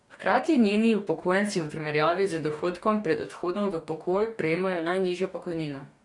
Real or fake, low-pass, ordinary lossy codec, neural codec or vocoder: fake; 10.8 kHz; none; codec, 44.1 kHz, 2.6 kbps, DAC